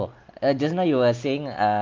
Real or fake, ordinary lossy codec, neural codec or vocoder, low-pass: real; Opus, 24 kbps; none; 7.2 kHz